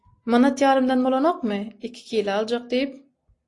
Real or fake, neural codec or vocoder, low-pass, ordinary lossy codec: real; none; 10.8 kHz; AAC, 48 kbps